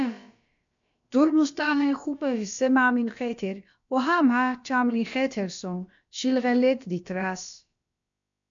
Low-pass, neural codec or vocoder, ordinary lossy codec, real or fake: 7.2 kHz; codec, 16 kHz, about 1 kbps, DyCAST, with the encoder's durations; MP3, 64 kbps; fake